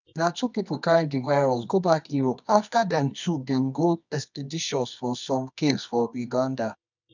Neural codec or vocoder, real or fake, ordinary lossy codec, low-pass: codec, 24 kHz, 0.9 kbps, WavTokenizer, medium music audio release; fake; none; 7.2 kHz